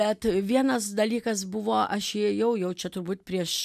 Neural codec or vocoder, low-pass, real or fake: none; 14.4 kHz; real